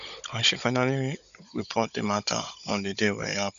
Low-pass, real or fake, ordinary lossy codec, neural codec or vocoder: 7.2 kHz; fake; none; codec, 16 kHz, 16 kbps, FunCodec, trained on Chinese and English, 50 frames a second